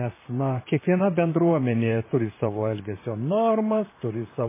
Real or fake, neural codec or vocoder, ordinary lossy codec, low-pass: fake; vocoder, 44.1 kHz, 128 mel bands, Pupu-Vocoder; MP3, 16 kbps; 3.6 kHz